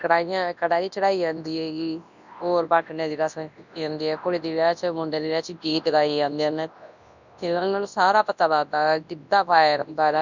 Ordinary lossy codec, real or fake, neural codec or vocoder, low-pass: none; fake; codec, 24 kHz, 0.9 kbps, WavTokenizer, large speech release; 7.2 kHz